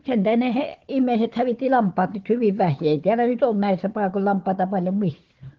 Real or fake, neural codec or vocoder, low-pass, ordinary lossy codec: fake; codec, 16 kHz, 4 kbps, FunCodec, trained on Chinese and English, 50 frames a second; 7.2 kHz; Opus, 16 kbps